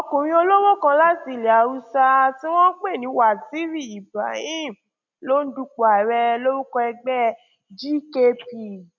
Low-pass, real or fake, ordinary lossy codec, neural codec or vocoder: 7.2 kHz; real; none; none